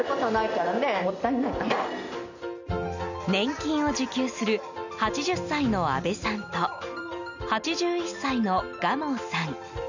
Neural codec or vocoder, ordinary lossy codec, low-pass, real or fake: none; none; 7.2 kHz; real